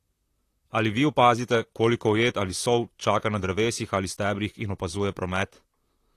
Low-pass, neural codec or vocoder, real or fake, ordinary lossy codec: 14.4 kHz; vocoder, 44.1 kHz, 128 mel bands, Pupu-Vocoder; fake; AAC, 48 kbps